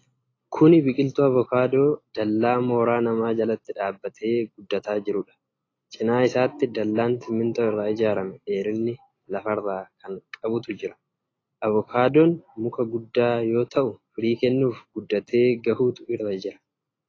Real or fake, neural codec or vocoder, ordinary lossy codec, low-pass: real; none; AAC, 32 kbps; 7.2 kHz